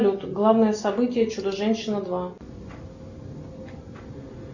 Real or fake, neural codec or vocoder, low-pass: real; none; 7.2 kHz